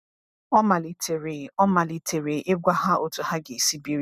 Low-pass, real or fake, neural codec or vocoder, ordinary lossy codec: 14.4 kHz; real; none; none